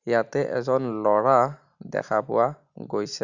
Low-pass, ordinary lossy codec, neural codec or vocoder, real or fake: 7.2 kHz; none; none; real